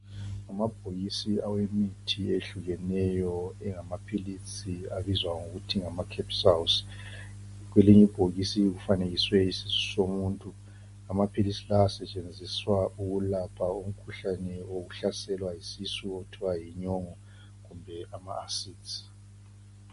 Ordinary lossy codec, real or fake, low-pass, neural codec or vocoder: MP3, 48 kbps; real; 14.4 kHz; none